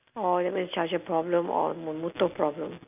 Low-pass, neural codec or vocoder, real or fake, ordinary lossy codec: 3.6 kHz; none; real; none